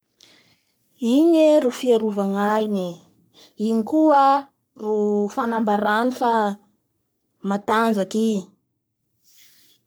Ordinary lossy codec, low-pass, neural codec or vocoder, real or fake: none; none; codec, 44.1 kHz, 3.4 kbps, Pupu-Codec; fake